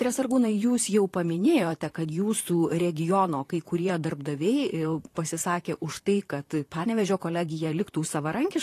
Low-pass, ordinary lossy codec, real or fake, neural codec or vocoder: 14.4 kHz; AAC, 48 kbps; fake; vocoder, 44.1 kHz, 128 mel bands, Pupu-Vocoder